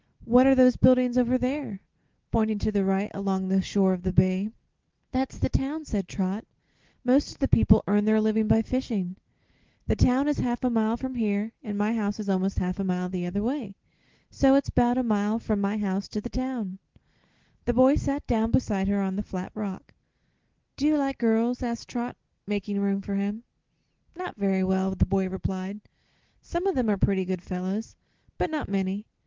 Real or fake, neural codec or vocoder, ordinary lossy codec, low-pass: real; none; Opus, 16 kbps; 7.2 kHz